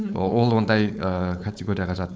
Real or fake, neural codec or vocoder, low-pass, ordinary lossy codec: fake; codec, 16 kHz, 4.8 kbps, FACodec; none; none